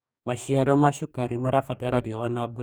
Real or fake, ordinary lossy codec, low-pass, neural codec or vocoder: fake; none; none; codec, 44.1 kHz, 2.6 kbps, DAC